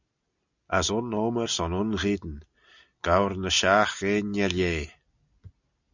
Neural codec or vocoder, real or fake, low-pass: none; real; 7.2 kHz